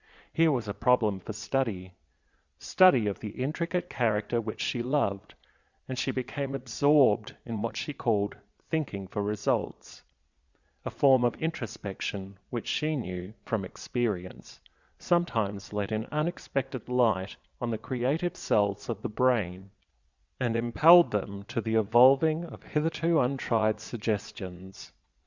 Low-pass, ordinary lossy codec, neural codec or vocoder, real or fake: 7.2 kHz; Opus, 64 kbps; vocoder, 22.05 kHz, 80 mel bands, Vocos; fake